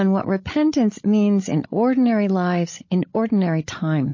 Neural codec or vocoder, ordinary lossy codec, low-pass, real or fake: codec, 16 kHz, 16 kbps, FreqCodec, larger model; MP3, 32 kbps; 7.2 kHz; fake